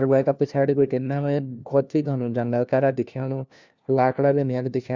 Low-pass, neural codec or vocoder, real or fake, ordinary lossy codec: 7.2 kHz; codec, 16 kHz, 1 kbps, FunCodec, trained on LibriTTS, 50 frames a second; fake; Opus, 64 kbps